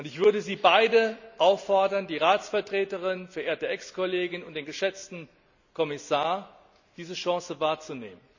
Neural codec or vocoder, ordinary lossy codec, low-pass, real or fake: none; none; 7.2 kHz; real